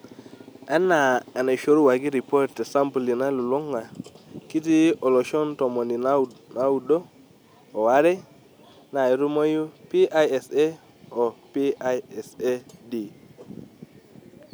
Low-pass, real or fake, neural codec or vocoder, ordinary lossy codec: none; real; none; none